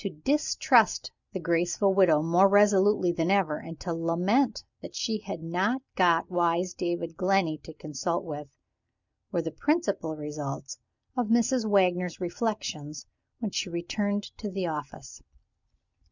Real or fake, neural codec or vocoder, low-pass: real; none; 7.2 kHz